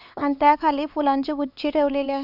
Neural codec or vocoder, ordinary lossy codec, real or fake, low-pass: codec, 16 kHz, 4 kbps, X-Codec, HuBERT features, trained on LibriSpeech; none; fake; 5.4 kHz